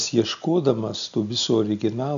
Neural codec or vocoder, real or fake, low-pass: none; real; 7.2 kHz